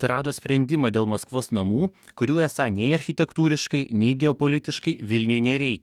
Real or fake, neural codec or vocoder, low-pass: fake; codec, 44.1 kHz, 2.6 kbps, DAC; 19.8 kHz